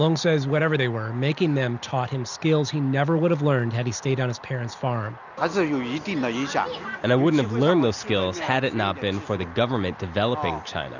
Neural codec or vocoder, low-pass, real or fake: none; 7.2 kHz; real